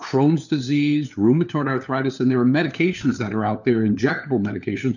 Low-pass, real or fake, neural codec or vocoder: 7.2 kHz; fake; codec, 16 kHz, 4 kbps, FunCodec, trained on LibriTTS, 50 frames a second